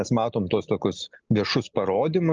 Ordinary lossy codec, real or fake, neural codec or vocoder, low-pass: Opus, 24 kbps; real; none; 7.2 kHz